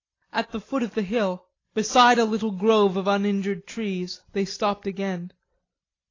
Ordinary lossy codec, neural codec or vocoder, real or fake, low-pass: AAC, 32 kbps; none; real; 7.2 kHz